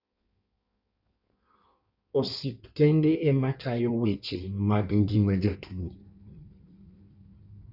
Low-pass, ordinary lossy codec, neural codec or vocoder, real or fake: 5.4 kHz; Opus, 64 kbps; codec, 16 kHz in and 24 kHz out, 1.1 kbps, FireRedTTS-2 codec; fake